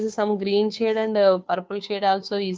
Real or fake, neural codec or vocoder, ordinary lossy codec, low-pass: fake; codec, 16 kHz, 2 kbps, FunCodec, trained on Chinese and English, 25 frames a second; none; none